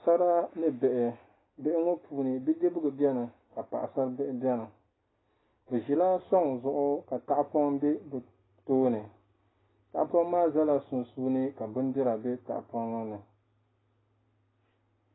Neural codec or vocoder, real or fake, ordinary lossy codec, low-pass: none; real; AAC, 16 kbps; 7.2 kHz